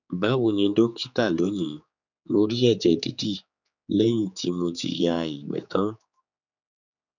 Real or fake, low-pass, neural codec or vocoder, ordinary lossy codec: fake; 7.2 kHz; codec, 16 kHz, 4 kbps, X-Codec, HuBERT features, trained on general audio; none